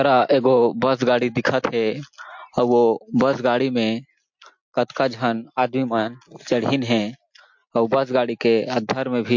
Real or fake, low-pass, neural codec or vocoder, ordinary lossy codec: real; 7.2 kHz; none; MP3, 48 kbps